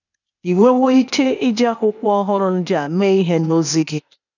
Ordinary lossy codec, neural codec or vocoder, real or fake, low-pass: none; codec, 16 kHz, 0.8 kbps, ZipCodec; fake; 7.2 kHz